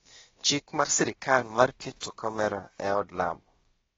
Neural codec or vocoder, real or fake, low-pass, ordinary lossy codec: codec, 16 kHz, about 1 kbps, DyCAST, with the encoder's durations; fake; 7.2 kHz; AAC, 24 kbps